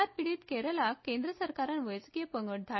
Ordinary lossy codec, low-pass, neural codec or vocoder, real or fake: MP3, 24 kbps; 7.2 kHz; none; real